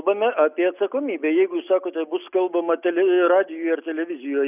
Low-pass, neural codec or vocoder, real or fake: 3.6 kHz; none; real